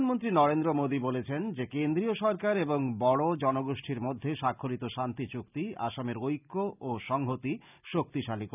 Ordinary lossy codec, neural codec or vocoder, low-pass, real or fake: none; none; 3.6 kHz; real